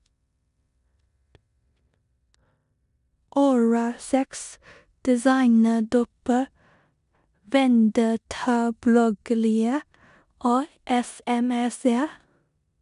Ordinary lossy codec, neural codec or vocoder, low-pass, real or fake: none; codec, 16 kHz in and 24 kHz out, 0.9 kbps, LongCat-Audio-Codec, four codebook decoder; 10.8 kHz; fake